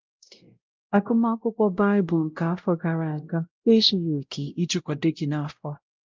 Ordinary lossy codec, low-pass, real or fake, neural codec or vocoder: Opus, 32 kbps; 7.2 kHz; fake; codec, 16 kHz, 0.5 kbps, X-Codec, WavLM features, trained on Multilingual LibriSpeech